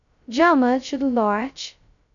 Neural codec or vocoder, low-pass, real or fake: codec, 16 kHz, 0.2 kbps, FocalCodec; 7.2 kHz; fake